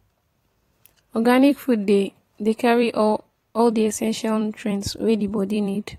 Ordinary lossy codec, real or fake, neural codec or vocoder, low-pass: AAC, 48 kbps; fake; vocoder, 44.1 kHz, 128 mel bands every 256 samples, BigVGAN v2; 19.8 kHz